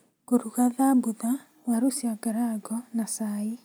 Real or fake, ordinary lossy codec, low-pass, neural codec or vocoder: real; none; none; none